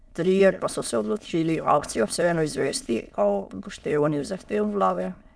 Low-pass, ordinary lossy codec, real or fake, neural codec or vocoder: none; none; fake; autoencoder, 22.05 kHz, a latent of 192 numbers a frame, VITS, trained on many speakers